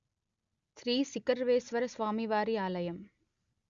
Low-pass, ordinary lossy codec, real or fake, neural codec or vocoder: 7.2 kHz; Opus, 64 kbps; real; none